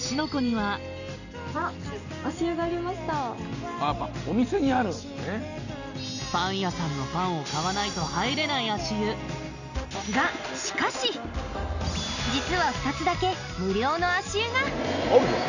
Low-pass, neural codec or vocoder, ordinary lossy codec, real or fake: 7.2 kHz; none; none; real